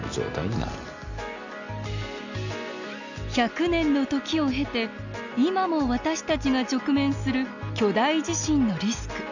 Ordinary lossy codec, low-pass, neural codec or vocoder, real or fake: none; 7.2 kHz; none; real